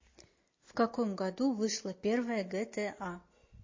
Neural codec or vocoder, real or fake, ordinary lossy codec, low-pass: none; real; MP3, 32 kbps; 7.2 kHz